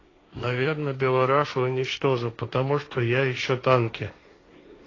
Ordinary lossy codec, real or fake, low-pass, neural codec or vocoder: AAC, 32 kbps; fake; 7.2 kHz; codec, 16 kHz, 1.1 kbps, Voila-Tokenizer